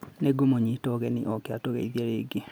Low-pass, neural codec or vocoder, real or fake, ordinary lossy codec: none; none; real; none